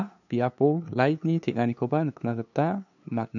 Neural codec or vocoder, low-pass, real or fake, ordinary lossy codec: codec, 16 kHz, 2 kbps, FunCodec, trained on LibriTTS, 25 frames a second; 7.2 kHz; fake; none